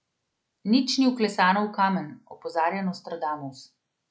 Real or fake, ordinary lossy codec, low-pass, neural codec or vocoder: real; none; none; none